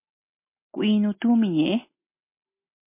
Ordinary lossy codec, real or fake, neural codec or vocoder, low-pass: MP3, 24 kbps; real; none; 3.6 kHz